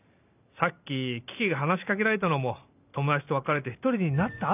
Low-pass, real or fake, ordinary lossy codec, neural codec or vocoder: 3.6 kHz; real; none; none